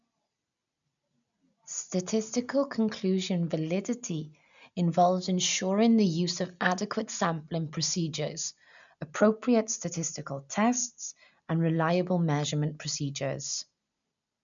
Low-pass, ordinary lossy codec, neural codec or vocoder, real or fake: 7.2 kHz; none; none; real